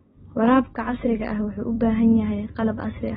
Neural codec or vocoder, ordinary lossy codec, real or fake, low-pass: none; AAC, 16 kbps; real; 7.2 kHz